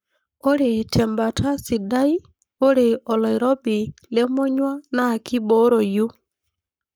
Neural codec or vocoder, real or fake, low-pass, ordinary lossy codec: codec, 44.1 kHz, 7.8 kbps, Pupu-Codec; fake; none; none